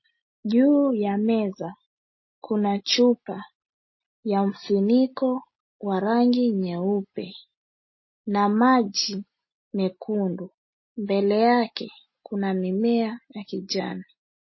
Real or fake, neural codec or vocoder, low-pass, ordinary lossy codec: real; none; 7.2 kHz; MP3, 24 kbps